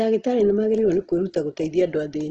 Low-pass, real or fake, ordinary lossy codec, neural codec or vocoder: 7.2 kHz; real; Opus, 16 kbps; none